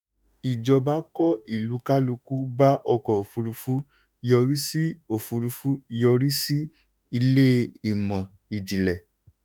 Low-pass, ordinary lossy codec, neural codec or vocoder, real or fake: none; none; autoencoder, 48 kHz, 32 numbers a frame, DAC-VAE, trained on Japanese speech; fake